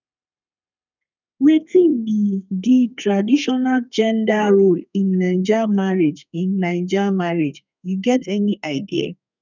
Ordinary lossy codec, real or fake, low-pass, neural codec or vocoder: none; fake; 7.2 kHz; codec, 32 kHz, 1.9 kbps, SNAC